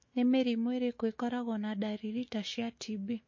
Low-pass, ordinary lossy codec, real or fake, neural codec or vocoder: 7.2 kHz; MP3, 32 kbps; fake; autoencoder, 48 kHz, 128 numbers a frame, DAC-VAE, trained on Japanese speech